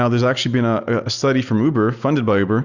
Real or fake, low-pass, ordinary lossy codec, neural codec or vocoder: real; 7.2 kHz; Opus, 64 kbps; none